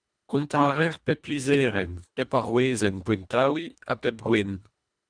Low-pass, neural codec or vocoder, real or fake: 9.9 kHz; codec, 24 kHz, 1.5 kbps, HILCodec; fake